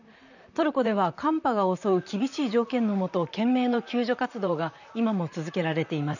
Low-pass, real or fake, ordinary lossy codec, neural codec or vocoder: 7.2 kHz; fake; none; vocoder, 44.1 kHz, 128 mel bands, Pupu-Vocoder